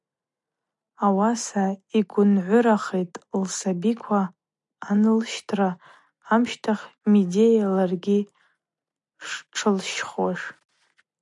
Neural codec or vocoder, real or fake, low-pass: none; real; 10.8 kHz